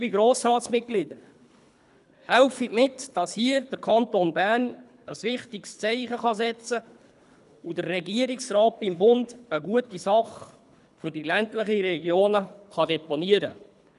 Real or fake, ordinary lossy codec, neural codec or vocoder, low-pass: fake; none; codec, 24 kHz, 3 kbps, HILCodec; 10.8 kHz